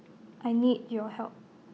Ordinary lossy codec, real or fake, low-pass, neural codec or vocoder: none; real; none; none